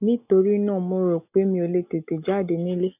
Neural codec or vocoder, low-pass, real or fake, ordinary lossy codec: none; 3.6 kHz; real; none